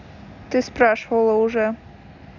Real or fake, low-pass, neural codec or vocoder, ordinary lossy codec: real; 7.2 kHz; none; none